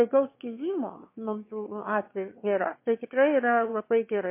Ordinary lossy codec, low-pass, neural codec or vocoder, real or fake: MP3, 24 kbps; 3.6 kHz; autoencoder, 22.05 kHz, a latent of 192 numbers a frame, VITS, trained on one speaker; fake